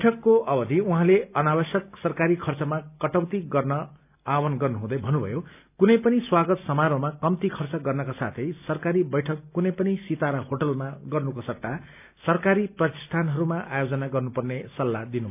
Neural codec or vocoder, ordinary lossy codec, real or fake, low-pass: none; none; real; 3.6 kHz